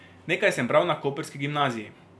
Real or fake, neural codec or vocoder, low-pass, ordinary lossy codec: real; none; none; none